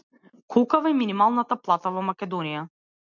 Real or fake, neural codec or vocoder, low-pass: real; none; 7.2 kHz